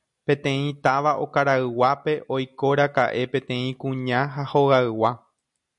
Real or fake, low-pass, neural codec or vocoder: real; 10.8 kHz; none